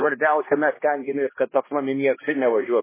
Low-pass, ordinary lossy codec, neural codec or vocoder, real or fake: 3.6 kHz; MP3, 16 kbps; codec, 16 kHz, 1 kbps, X-Codec, HuBERT features, trained on balanced general audio; fake